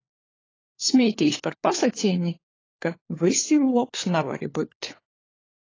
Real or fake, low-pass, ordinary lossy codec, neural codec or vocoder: fake; 7.2 kHz; AAC, 32 kbps; codec, 16 kHz, 4 kbps, FunCodec, trained on LibriTTS, 50 frames a second